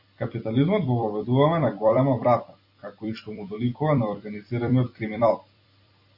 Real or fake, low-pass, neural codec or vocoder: fake; 5.4 kHz; vocoder, 44.1 kHz, 128 mel bands every 256 samples, BigVGAN v2